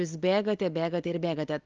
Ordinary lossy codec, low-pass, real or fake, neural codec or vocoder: Opus, 32 kbps; 7.2 kHz; real; none